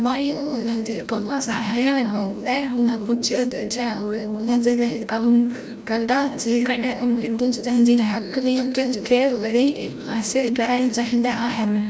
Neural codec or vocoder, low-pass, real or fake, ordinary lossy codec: codec, 16 kHz, 0.5 kbps, FreqCodec, larger model; none; fake; none